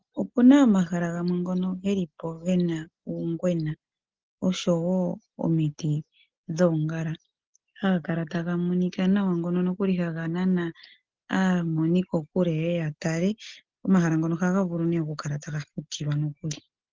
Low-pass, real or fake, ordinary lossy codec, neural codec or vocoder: 7.2 kHz; real; Opus, 16 kbps; none